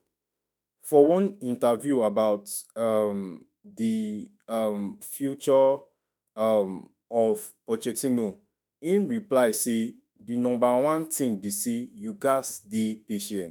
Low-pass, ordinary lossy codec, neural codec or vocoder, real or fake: none; none; autoencoder, 48 kHz, 32 numbers a frame, DAC-VAE, trained on Japanese speech; fake